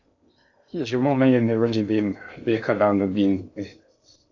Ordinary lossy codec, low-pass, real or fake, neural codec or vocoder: AAC, 48 kbps; 7.2 kHz; fake; codec, 16 kHz in and 24 kHz out, 0.6 kbps, FocalCodec, streaming, 2048 codes